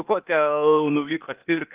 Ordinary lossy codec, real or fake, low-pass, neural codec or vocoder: Opus, 32 kbps; fake; 3.6 kHz; codec, 16 kHz, 0.8 kbps, ZipCodec